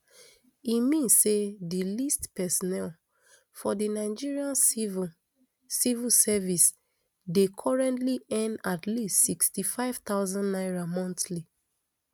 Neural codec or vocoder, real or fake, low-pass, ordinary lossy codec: none; real; none; none